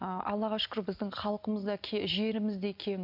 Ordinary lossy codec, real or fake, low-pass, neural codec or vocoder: MP3, 48 kbps; real; 5.4 kHz; none